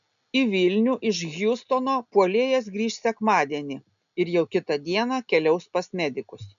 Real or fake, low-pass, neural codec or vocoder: real; 7.2 kHz; none